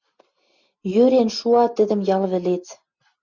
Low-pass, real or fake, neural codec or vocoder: 7.2 kHz; real; none